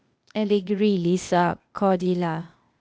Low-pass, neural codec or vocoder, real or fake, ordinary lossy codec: none; codec, 16 kHz, 0.8 kbps, ZipCodec; fake; none